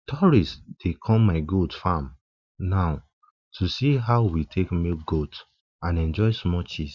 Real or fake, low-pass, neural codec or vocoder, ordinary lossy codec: real; 7.2 kHz; none; Opus, 64 kbps